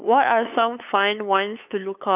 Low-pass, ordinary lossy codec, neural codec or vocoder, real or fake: 3.6 kHz; none; codec, 16 kHz, 8 kbps, FunCodec, trained on LibriTTS, 25 frames a second; fake